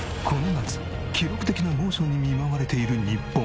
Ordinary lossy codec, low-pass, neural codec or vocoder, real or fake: none; none; none; real